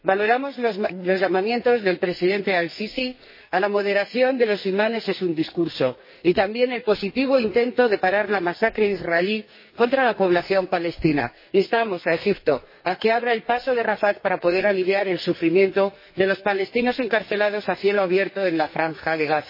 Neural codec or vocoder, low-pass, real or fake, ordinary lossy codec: codec, 44.1 kHz, 2.6 kbps, SNAC; 5.4 kHz; fake; MP3, 24 kbps